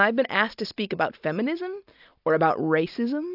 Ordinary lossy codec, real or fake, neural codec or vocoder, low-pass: AAC, 48 kbps; real; none; 5.4 kHz